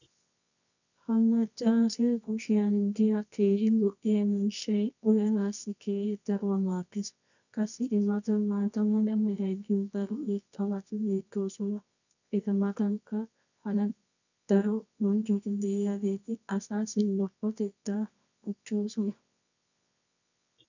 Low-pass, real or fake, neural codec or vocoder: 7.2 kHz; fake; codec, 24 kHz, 0.9 kbps, WavTokenizer, medium music audio release